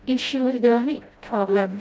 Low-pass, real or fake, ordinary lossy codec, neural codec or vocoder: none; fake; none; codec, 16 kHz, 0.5 kbps, FreqCodec, smaller model